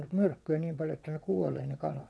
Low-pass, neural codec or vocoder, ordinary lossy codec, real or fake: none; vocoder, 22.05 kHz, 80 mel bands, WaveNeXt; none; fake